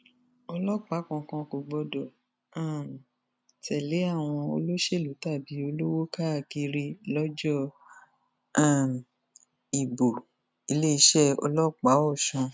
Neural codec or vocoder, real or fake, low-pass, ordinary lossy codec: none; real; none; none